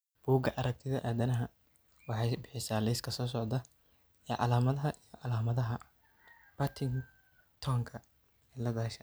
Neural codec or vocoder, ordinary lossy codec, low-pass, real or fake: none; none; none; real